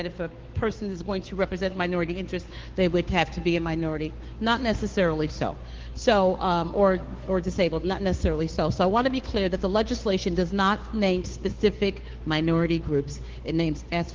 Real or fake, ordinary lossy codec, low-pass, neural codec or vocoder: fake; Opus, 16 kbps; 7.2 kHz; codec, 16 kHz, 2 kbps, FunCodec, trained on Chinese and English, 25 frames a second